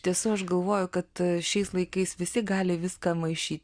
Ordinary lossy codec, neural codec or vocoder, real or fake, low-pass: Opus, 64 kbps; none; real; 9.9 kHz